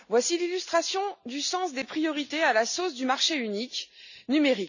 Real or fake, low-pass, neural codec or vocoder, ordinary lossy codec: real; 7.2 kHz; none; none